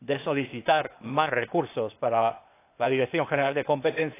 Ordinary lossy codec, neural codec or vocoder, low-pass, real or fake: AAC, 24 kbps; codec, 16 kHz, 0.8 kbps, ZipCodec; 3.6 kHz; fake